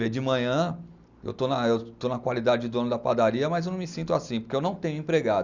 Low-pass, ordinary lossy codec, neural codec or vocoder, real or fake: 7.2 kHz; Opus, 64 kbps; none; real